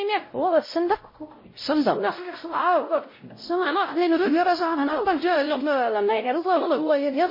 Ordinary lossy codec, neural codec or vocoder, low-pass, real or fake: MP3, 24 kbps; codec, 16 kHz, 0.5 kbps, X-Codec, WavLM features, trained on Multilingual LibriSpeech; 5.4 kHz; fake